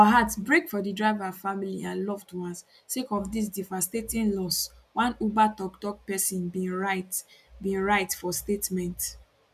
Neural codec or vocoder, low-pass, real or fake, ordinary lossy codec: none; 14.4 kHz; real; none